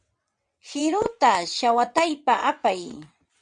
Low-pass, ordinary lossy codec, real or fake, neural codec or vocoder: 9.9 kHz; MP3, 64 kbps; fake; vocoder, 22.05 kHz, 80 mel bands, WaveNeXt